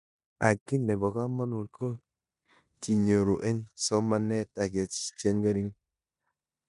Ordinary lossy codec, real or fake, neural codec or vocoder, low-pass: none; fake; codec, 16 kHz in and 24 kHz out, 0.9 kbps, LongCat-Audio-Codec, four codebook decoder; 10.8 kHz